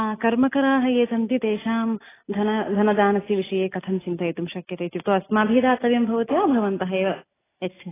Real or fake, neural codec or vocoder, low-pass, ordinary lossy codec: real; none; 3.6 kHz; AAC, 16 kbps